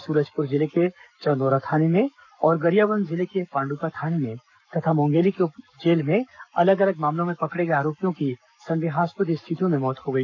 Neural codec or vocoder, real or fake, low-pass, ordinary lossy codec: codec, 44.1 kHz, 7.8 kbps, Pupu-Codec; fake; 7.2 kHz; none